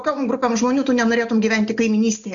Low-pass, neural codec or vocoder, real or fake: 7.2 kHz; none; real